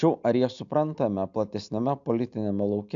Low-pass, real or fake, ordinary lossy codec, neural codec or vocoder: 7.2 kHz; real; AAC, 64 kbps; none